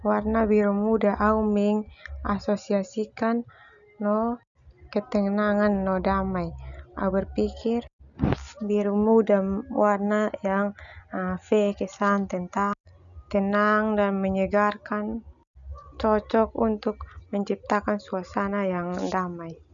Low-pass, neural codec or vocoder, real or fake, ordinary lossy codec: 7.2 kHz; none; real; none